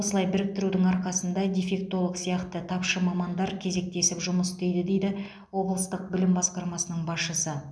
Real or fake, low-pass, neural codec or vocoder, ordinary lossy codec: real; none; none; none